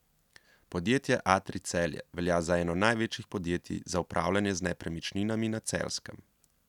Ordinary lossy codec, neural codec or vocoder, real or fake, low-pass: none; none; real; 19.8 kHz